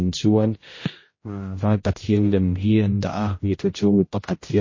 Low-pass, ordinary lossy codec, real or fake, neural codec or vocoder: 7.2 kHz; MP3, 32 kbps; fake; codec, 16 kHz, 0.5 kbps, X-Codec, HuBERT features, trained on general audio